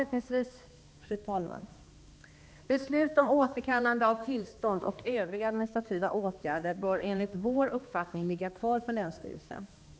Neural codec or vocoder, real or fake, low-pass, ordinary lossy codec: codec, 16 kHz, 2 kbps, X-Codec, HuBERT features, trained on balanced general audio; fake; none; none